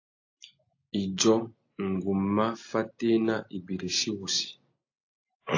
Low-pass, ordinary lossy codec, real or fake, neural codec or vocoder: 7.2 kHz; AAC, 48 kbps; real; none